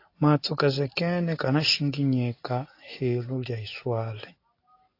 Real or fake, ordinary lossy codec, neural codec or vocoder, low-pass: real; AAC, 32 kbps; none; 5.4 kHz